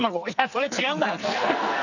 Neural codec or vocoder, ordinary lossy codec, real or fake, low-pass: codec, 44.1 kHz, 2.6 kbps, SNAC; none; fake; 7.2 kHz